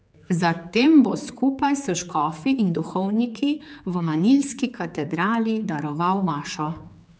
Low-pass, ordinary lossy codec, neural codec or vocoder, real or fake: none; none; codec, 16 kHz, 4 kbps, X-Codec, HuBERT features, trained on general audio; fake